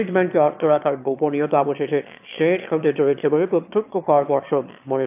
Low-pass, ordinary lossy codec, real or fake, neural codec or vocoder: 3.6 kHz; AAC, 32 kbps; fake; autoencoder, 22.05 kHz, a latent of 192 numbers a frame, VITS, trained on one speaker